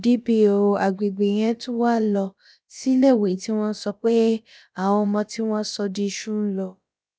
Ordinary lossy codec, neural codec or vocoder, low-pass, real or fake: none; codec, 16 kHz, about 1 kbps, DyCAST, with the encoder's durations; none; fake